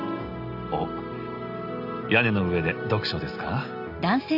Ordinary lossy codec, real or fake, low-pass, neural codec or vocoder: Opus, 64 kbps; real; 5.4 kHz; none